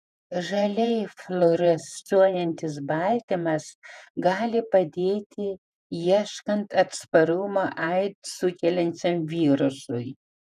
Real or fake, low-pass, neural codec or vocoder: fake; 14.4 kHz; vocoder, 48 kHz, 128 mel bands, Vocos